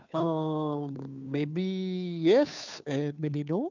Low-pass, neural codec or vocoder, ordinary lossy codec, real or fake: 7.2 kHz; codec, 16 kHz, 2 kbps, FunCodec, trained on Chinese and English, 25 frames a second; none; fake